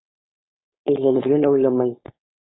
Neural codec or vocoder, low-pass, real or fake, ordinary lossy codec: codec, 16 kHz, 4.8 kbps, FACodec; 7.2 kHz; fake; AAC, 16 kbps